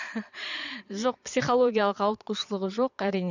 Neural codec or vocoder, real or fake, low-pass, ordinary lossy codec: vocoder, 22.05 kHz, 80 mel bands, Vocos; fake; 7.2 kHz; none